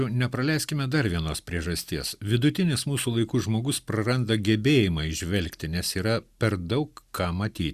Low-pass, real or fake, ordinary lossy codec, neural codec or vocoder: 14.4 kHz; real; Opus, 64 kbps; none